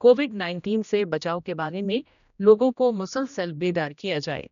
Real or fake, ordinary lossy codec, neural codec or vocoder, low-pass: fake; none; codec, 16 kHz, 1 kbps, X-Codec, HuBERT features, trained on general audio; 7.2 kHz